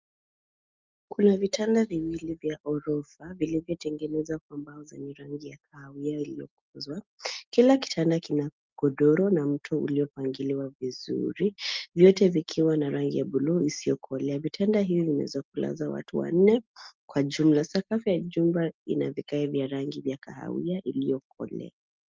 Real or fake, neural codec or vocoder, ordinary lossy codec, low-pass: real; none; Opus, 24 kbps; 7.2 kHz